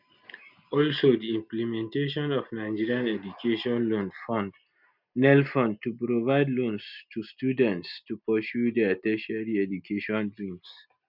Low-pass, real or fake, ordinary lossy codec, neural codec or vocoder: 5.4 kHz; real; none; none